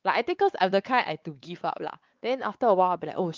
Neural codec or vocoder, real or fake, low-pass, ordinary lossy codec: codec, 16 kHz, 4 kbps, X-Codec, WavLM features, trained on Multilingual LibriSpeech; fake; 7.2 kHz; Opus, 24 kbps